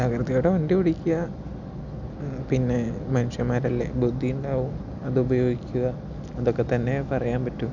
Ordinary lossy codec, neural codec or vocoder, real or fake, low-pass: none; none; real; 7.2 kHz